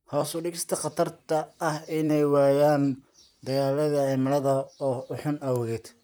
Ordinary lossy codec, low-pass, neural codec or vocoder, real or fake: none; none; vocoder, 44.1 kHz, 128 mel bands, Pupu-Vocoder; fake